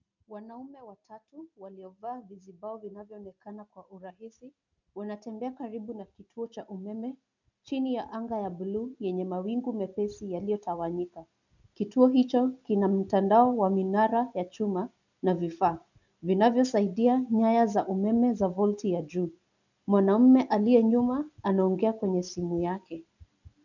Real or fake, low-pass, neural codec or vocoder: real; 7.2 kHz; none